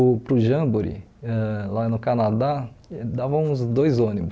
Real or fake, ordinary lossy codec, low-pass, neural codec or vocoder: real; none; none; none